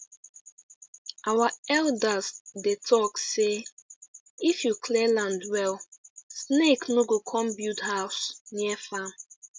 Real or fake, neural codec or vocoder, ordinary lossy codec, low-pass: real; none; none; none